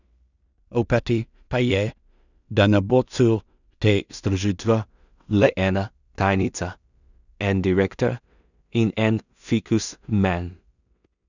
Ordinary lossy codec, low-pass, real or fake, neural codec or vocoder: none; 7.2 kHz; fake; codec, 16 kHz in and 24 kHz out, 0.4 kbps, LongCat-Audio-Codec, two codebook decoder